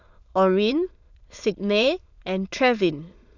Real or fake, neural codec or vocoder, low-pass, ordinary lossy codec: fake; autoencoder, 22.05 kHz, a latent of 192 numbers a frame, VITS, trained on many speakers; 7.2 kHz; none